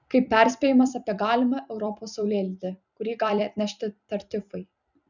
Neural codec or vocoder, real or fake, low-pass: vocoder, 44.1 kHz, 128 mel bands every 256 samples, BigVGAN v2; fake; 7.2 kHz